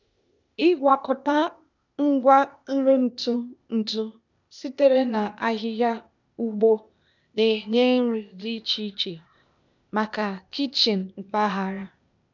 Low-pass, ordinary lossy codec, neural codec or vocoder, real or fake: 7.2 kHz; none; codec, 16 kHz, 0.8 kbps, ZipCodec; fake